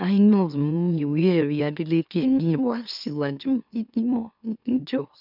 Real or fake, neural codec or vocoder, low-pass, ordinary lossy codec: fake; autoencoder, 44.1 kHz, a latent of 192 numbers a frame, MeloTTS; 5.4 kHz; none